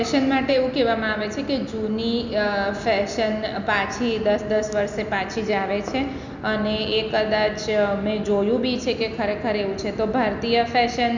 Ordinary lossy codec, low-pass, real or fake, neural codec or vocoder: none; 7.2 kHz; real; none